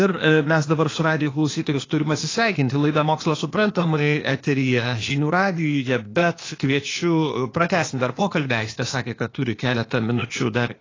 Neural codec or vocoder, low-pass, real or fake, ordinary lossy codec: codec, 16 kHz, 0.8 kbps, ZipCodec; 7.2 kHz; fake; AAC, 32 kbps